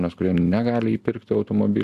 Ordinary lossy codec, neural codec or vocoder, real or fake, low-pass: Opus, 64 kbps; none; real; 14.4 kHz